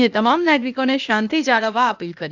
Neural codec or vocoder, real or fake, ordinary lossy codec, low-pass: codec, 16 kHz, 0.8 kbps, ZipCodec; fake; none; 7.2 kHz